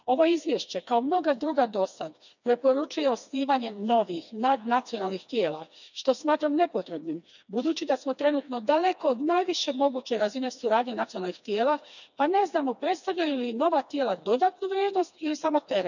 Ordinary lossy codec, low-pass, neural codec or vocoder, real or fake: none; 7.2 kHz; codec, 16 kHz, 2 kbps, FreqCodec, smaller model; fake